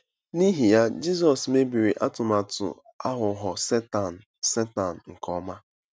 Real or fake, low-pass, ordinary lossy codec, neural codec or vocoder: real; none; none; none